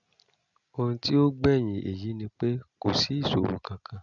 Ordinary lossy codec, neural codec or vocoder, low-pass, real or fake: none; none; 7.2 kHz; real